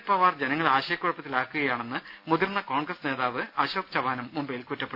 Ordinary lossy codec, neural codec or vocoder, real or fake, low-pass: none; none; real; 5.4 kHz